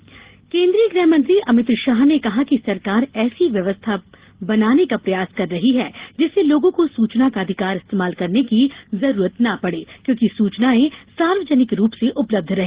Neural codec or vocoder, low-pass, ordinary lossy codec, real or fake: none; 3.6 kHz; Opus, 16 kbps; real